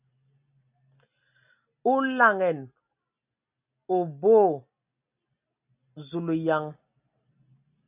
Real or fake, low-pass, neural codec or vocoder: real; 3.6 kHz; none